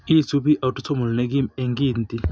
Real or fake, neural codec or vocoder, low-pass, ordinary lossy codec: real; none; none; none